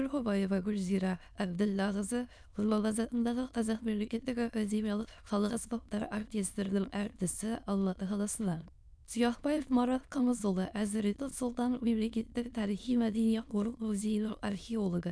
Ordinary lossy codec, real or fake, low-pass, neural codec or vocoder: none; fake; none; autoencoder, 22.05 kHz, a latent of 192 numbers a frame, VITS, trained on many speakers